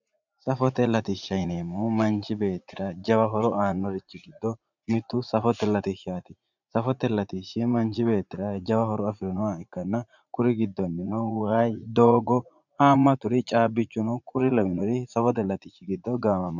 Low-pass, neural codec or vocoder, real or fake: 7.2 kHz; vocoder, 44.1 kHz, 80 mel bands, Vocos; fake